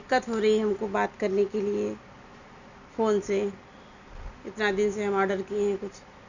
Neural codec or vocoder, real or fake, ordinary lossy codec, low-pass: none; real; none; 7.2 kHz